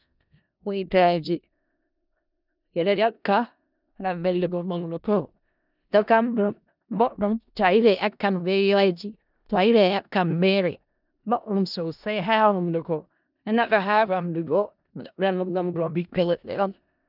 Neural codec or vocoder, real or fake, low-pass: codec, 16 kHz in and 24 kHz out, 0.4 kbps, LongCat-Audio-Codec, four codebook decoder; fake; 5.4 kHz